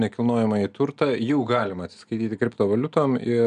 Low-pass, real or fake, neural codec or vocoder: 9.9 kHz; real; none